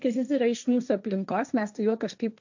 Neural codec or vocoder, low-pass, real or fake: codec, 16 kHz, 1.1 kbps, Voila-Tokenizer; 7.2 kHz; fake